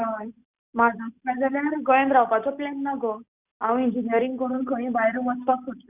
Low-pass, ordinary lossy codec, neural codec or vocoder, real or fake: 3.6 kHz; Opus, 64 kbps; none; real